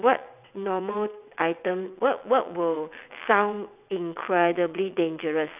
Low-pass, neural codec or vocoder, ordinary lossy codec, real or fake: 3.6 kHz; vocoder, 22.05 kHz, 80 mel bands, WaveNeXt; none; fake